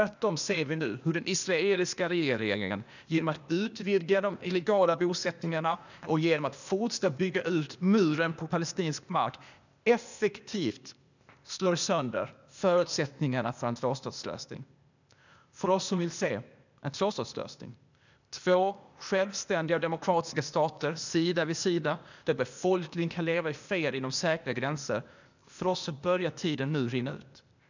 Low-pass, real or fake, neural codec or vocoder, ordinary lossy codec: 7.2 kHz; fake; codec, 16 kHz, 0.8 kbps, ZipCodec; none